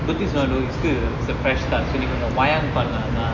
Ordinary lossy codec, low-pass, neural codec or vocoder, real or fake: MP3, 32 kbps; 7.2 kHz; none; real